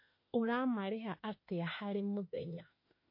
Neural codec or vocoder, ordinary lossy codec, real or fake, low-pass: autoencoder, 48 kHz, 32 numbers a frame, DAC-VAE, trained on Japanese speech; MP3, 32 kbps; fake; 5.4 kHz